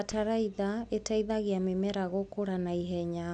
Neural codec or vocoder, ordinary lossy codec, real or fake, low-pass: none; none; real; 10.8 kHz